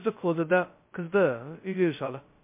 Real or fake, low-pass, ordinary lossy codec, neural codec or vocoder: fake; 3.6 kHz; MP3, 24 kbps; codec, 16 kHz, 0.2 kbps, FocalCodec